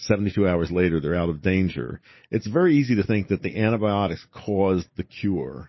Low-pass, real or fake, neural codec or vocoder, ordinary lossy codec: 7.2 kHz; real; none; MP3, 24 kbps